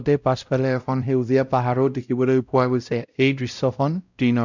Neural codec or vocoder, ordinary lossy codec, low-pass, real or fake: codec, 16 kHz, 0.5 kbps, X-Codec, WavLM features, trained on Multilingual LibriSpeech; none; 7.2 kHz; fake